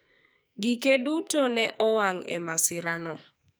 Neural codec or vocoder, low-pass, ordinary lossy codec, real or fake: codec, 44.1 kHz, 2.6 kbps, SNAC; none; none; fake